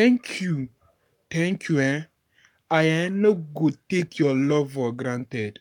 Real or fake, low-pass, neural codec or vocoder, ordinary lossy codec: fake; 19.8 kHz; codec, 44.1 kHz, 7.8 kbps, Pupu-Codec; none